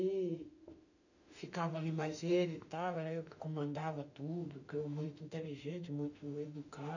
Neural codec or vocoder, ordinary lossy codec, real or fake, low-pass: autoencoder, 48 kHz, 32 numbers a frame, DAC-VAE, trained on Japanese speech; none; fake; 7.2 kHz